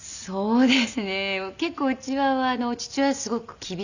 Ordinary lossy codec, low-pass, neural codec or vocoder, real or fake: none; 7.2 kHz; none; real